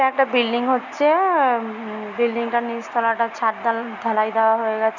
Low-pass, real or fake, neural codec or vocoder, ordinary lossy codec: 7.2 kHz; real; none; none